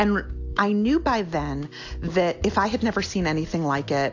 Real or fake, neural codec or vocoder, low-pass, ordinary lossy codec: real; none; 7.2 kHz; AAC, 48 kbps